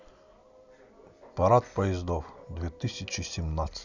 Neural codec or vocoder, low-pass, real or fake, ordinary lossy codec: none; 7.2 kHz; real; none